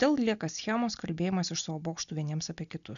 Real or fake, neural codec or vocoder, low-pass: real; none; 7.2 kHz